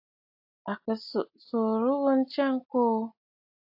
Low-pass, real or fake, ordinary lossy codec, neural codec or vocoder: 5.4 kHz; real; AAC, 48 kbps; none